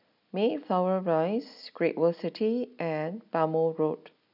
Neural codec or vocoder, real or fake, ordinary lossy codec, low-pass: none; real; none; 5.4 kHz